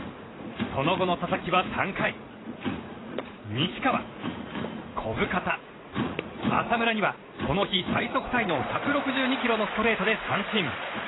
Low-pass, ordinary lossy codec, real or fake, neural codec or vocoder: 7.2 kHz; AAC, 16 kbps; fake; vocoder, 44.1 kHz, 80 mel bands, Vocos